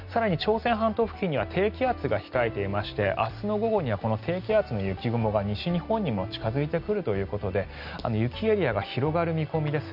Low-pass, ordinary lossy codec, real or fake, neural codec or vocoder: 5.4 kHz; none; real; none